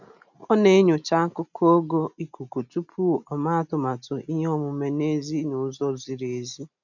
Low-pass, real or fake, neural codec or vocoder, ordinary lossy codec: 7.2 kHz; real; none; none